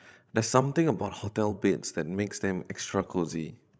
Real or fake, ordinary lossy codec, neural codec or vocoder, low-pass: fake; none; codec, 16 kHz, 16 kbps, FreqCodec, larger model; none